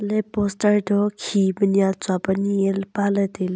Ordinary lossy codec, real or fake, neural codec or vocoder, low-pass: none; real; none; none